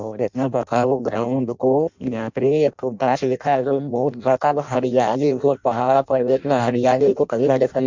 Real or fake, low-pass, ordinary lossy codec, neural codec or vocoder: fake; 7.2 kHz; MP3, 64 kbps; codec, 16 kHz in and 24 kHz out, 0.6 kbps, FireRedTTS-2 codec